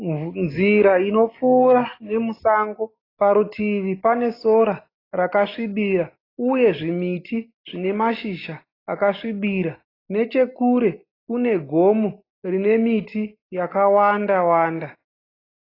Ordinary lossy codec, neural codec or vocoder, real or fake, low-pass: AAC, 24 kbps; none; real; 5.4 kHz